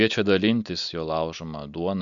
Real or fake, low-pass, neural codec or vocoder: real; 7.2 kHz; none